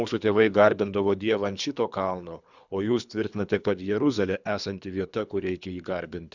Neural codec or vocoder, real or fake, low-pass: codec, 24 kHz, 3 kbps, HILCodec; fake; 7.2 kHz